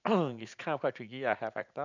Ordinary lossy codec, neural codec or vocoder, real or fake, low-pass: none; vocoder, 44.1 kHz, 80 mel bands, Vocos; fake; 7.2 kHz